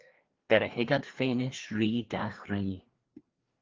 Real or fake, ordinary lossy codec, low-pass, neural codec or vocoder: fake; Opus, 16 kbps; 7.2 kHz; codec, 16 kHz, 2 kbps, FreqCodec, larger model